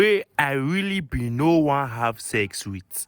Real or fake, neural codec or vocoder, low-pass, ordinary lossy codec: real; none; none; none